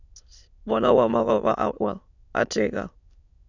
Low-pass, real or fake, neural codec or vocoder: 7.2 kHz; fake; autoencoder, 22.05 kHz, a latent of 192 numbers a frame, VITS, trained on many speakers